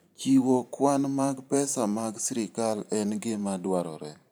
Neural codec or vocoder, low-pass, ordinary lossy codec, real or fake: vocoder, 44.1 kHz, 128 mel bands every 512 samples, BigVGAN v2; none; none; fake